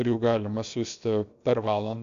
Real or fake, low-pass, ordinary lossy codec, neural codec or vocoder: fake; 7.2 kHz; Opus, 64 kbps; codec, 16 kHz, about 1 kbps, DyCAST, with the encoder's durations